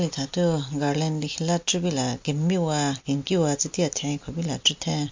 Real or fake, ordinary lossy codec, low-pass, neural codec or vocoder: real; MP3, 48 kbps; 7.2 kHz; none